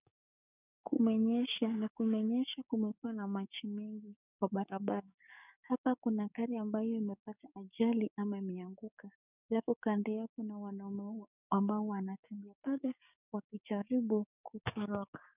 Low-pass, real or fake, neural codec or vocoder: 3.6 kHz; fake; codec, 16 kHz, 6 kbps, DAC